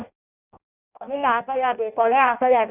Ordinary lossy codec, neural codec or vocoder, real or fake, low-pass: none; codec, 16 kHz in and 24 kHz out, 0.6 kbps, FireRedTTS-2 codec; fake; 3.6 kHz